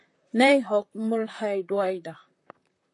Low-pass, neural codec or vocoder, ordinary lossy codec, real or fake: 10.8 kHz; vocoder, 44.1 kHz, 128 mel bands, Pupu-Vocoder; AAC, 48 kbps; fake